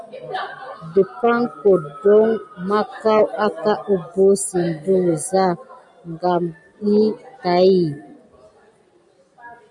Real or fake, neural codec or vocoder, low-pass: real; none; 10.8 kHz